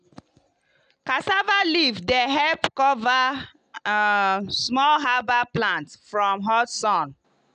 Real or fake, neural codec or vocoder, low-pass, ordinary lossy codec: real; none; 9.9 kHz; none